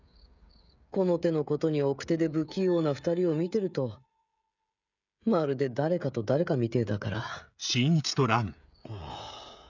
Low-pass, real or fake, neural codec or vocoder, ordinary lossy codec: 7.2 kHz; fake; codec, 16 kHz, 16 kbps, FreqCodec, smaller model; none